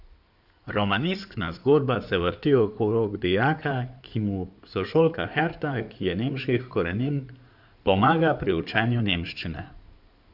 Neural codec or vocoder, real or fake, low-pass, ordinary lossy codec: codec, 16 kHz in and 24 kHz out, 2.2 kbps, FireRedTTS-2 codec; fake; 5.4 kHz; none